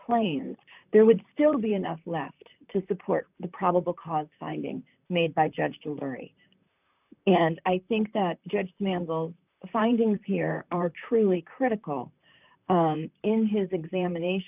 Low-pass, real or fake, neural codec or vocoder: 3.6 kHz; fake; vocoder, 44.1 kHz, 128 mel bands, Pupu-Vocoder